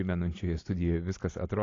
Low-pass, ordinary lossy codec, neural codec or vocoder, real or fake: 7.2 kHz; AAC, 32 kbps; none; real